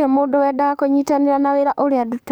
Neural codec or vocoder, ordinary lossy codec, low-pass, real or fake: codec, 44.1 kHz, 7.8 kbps, DAC; none; none; fake